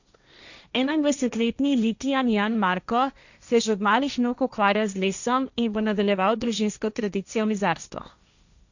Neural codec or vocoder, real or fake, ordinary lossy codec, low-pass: codec, 16 kHz, 1.1 kbps, Voila-Tokenizer; fake; none; 7.2 kHz